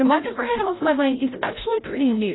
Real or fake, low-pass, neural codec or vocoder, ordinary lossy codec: fake; 7.2 kHz; codec, 16 kHz, 0.5 kbps, FreqCodec, larger model; AAC, 16 kbps